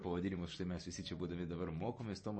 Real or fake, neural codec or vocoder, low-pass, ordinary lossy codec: real; none; 9.9 kHz; MP3, 32 kbps